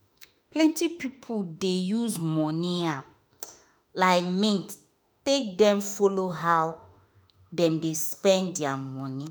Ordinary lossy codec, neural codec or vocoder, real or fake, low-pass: none; autoencoder, 48 kHz, 32 numbers a frame, DAC-VAE, trained on Japanese speech; fake; none